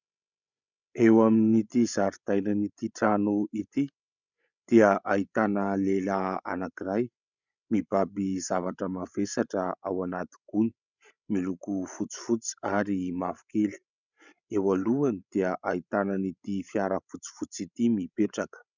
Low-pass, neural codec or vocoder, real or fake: 7.2 kHz; codec, 16 kHz, 16 kbps, FreqCodec, larger model; fake